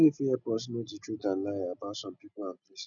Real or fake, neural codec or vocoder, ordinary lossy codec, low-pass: real; none; none; 7.2 kHz